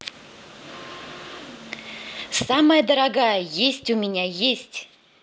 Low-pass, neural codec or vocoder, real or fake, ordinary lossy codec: none; none; real; none